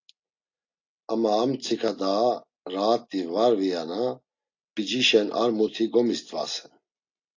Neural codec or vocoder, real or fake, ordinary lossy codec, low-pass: none; real; AAC, 48 kbps; 7.2 kHz